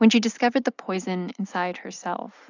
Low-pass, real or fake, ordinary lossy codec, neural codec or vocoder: 7.2 kHz; real; AAC, 48 kbps; none